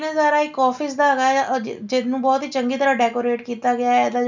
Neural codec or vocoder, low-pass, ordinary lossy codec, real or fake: none; 7.2 kHz; none; real